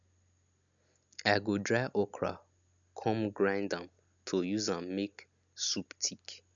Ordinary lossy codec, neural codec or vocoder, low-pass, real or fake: none; none; 7.2 kHz; real